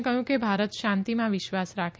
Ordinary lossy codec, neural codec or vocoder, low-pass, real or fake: none; none; none; real